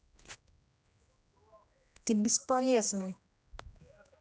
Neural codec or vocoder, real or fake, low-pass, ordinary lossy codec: codec, 16 kHz, 1 kbps, X-Codec, HuBERT features, trained on general audio; fake; none; none